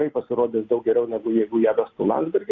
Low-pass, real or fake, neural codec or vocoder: 7.2 kHz; real; none